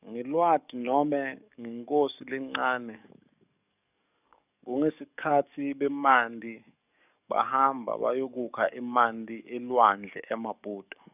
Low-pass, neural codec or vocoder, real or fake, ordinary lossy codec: 3.6 kHz; codec, 44.1 kHz, 7.8 kbps, DAC; fake; none